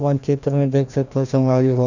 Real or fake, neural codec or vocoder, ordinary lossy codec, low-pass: fake; codec, 16 kHz, 1 kbps, FreqCodec, larger model; none; 7.2 kHz